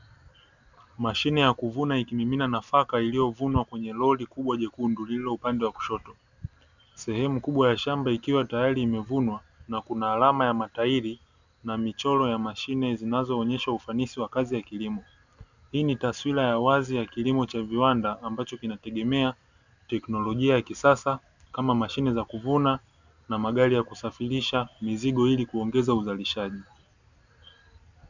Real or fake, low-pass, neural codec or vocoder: real; 7.2 kHz; none